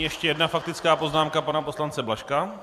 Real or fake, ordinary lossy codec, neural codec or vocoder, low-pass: fake; AAC, 96 kbps; vocoder, 44.1 kHz, 128 mel bands every 256 samples, BigVGAN v2; 14.4 kHz